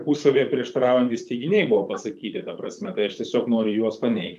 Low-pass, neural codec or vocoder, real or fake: 14.4 kHz; vocoder, 44.1 kHz, 128 mel bands, Pupu-Vocoder; fake